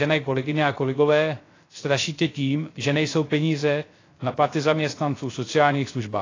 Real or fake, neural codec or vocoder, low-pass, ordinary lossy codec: fake; codec, 16 kHz, 0.3 kbps, FocalCodec; 7.2 kHz; AAC, 32 kbps